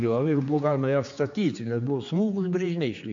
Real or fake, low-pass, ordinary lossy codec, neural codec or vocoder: fake; 7.2 kHz; MP3, 64 kbps; codec, 16 kHz, 4 kbps, X-Codec, HuBERT features, trained on general audio